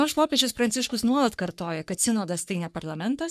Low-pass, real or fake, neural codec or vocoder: 14.4 kHz; fake; codec, 44.1 kHz, 3.4 kbps, Pupu-Codec